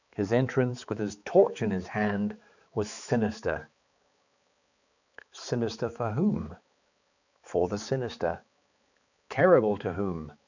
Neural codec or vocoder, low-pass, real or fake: codec, 16 kHz, 4 kbps, X-Codec, HuBERT features, trained on balanced general audio; 7.2 kHz; fake